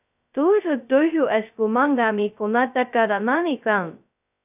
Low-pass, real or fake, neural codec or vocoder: 3.6 kHz; fake; codec, 16 kHz, 0.2 kbps, FocalCodec